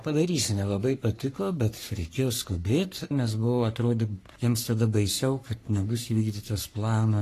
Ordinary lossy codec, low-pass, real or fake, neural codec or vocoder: AAC, 48 kbps; 14.4 kHz; fake; codec, 44.1 kHz, 3.4 kbps, Pupu-Codec